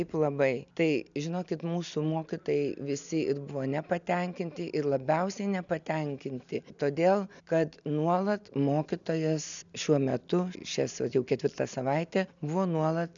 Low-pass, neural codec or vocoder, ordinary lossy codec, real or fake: 7.2 kHz; none; MP3, 96 kbps; real